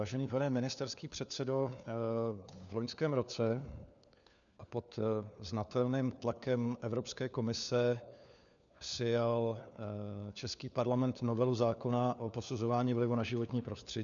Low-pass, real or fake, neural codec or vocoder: 7.2 kHz; fake; codec, 16 kHz, 4 kbps, FunCodec, trained on LibriTTS, 50 frames a second